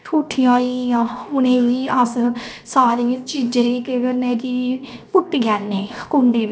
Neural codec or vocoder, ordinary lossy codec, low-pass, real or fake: codec, 16 kHz, 0.7 kbps, FocalCodec; none; none; fake